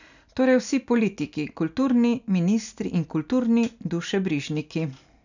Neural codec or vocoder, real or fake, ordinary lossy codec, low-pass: none; real; none; 7.2 kHz